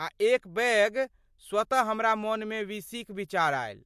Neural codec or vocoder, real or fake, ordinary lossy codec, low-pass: none; real; MP3, 64 kbps; 14.4 kHz